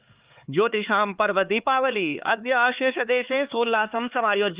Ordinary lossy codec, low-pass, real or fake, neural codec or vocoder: Opus, 32 kbps; 3.6 kHz; fake; codec, 16 kHz, 4 kbps, X-Codec, HuBERT features, trained on LibriSpeech